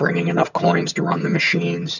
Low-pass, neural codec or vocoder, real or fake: 7.2 kHz; vocoder, 22.05 kHz, 80 mel bands, HiFi-GAN; fake